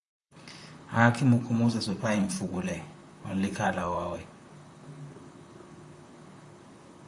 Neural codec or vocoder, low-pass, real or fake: vocoder, 44.1 kHz, 128 mel bands, Pupu-Vocoder; 10.8 kHz; fake